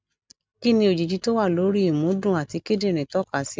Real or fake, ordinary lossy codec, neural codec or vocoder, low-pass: real; none; none; none